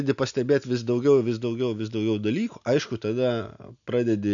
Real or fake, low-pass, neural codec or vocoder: real; 7.2 kHz; none